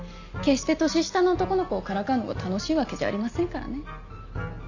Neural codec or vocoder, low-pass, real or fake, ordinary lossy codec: none; 7.2 kHz; real; none